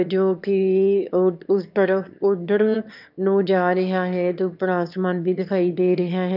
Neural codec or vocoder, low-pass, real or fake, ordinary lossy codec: autoencoder, 22.05 kHz, a latent of 192 numbers a frame, VITS, trained on one speaker; 5.4 kHz; fake; none